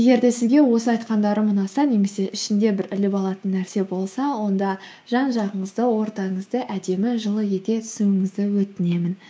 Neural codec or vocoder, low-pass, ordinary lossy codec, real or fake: codec, 16 kHz, 6 kbps, DAC; none; none; fake